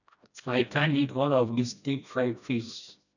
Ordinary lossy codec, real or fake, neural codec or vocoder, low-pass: none; fake; codec, 16 kHz, 1 kbps, FreqCodec, smaller model; 7.2 kHz